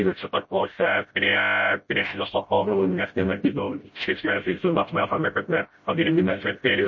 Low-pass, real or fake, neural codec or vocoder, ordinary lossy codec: 7.2 kHz; fake; codec, 16 kHz, 0.5 kbps, FreqCodec, smaller model; MP3, 32 kbps